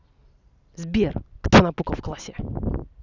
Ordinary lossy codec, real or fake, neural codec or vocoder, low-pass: none; real; none; 7.2 kHz